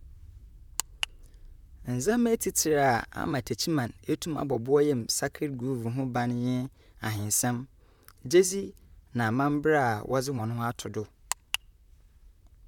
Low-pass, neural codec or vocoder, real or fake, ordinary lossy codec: 19.8 kHz; vocoder, 44.1 kHz, 128 mel bands, Pupu-Vocoder; fake; none